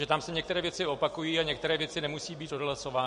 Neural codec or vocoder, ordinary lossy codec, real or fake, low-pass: none; MP3, 48 kbps; real; 10.8 kHz